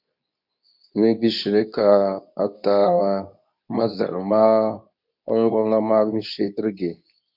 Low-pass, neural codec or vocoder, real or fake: 5.4 kHz; codec, 24 kHz, 0.9 kbps, WavTokenizer, medium speech release version 2; fake